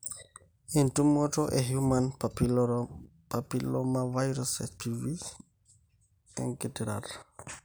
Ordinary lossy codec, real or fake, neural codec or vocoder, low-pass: none; real; none; none